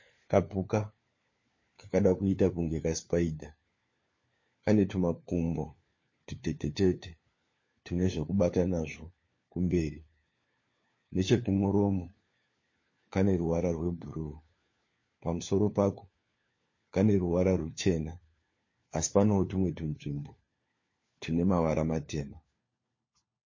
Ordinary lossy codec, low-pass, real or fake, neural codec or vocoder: MP3, 32 kbps; 7.2 kHz; fake; codec, 16 kHz, 4 kbps, FunCodec, trained on LibriTTS, 50 frames a second